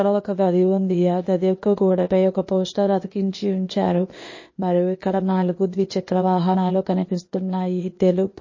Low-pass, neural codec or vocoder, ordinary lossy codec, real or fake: 7.2 kHz; codec, 16 kHz, 0.8 kbps, ZipCodec; MP3, 32 kbps; fake